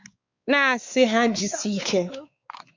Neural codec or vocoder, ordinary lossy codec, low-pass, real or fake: codec, 16 kHz, 4 kbps, X-Codec, WavLM features, trained on Multilingual LibriSpeech; MP3, 64 kbps; 7.2 kHz; fake